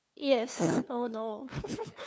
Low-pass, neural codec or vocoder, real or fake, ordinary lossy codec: none; codec, 16 kHz, 2 kbps, FunCodec, trained on LibriTTS, 25 frames a second; fake; none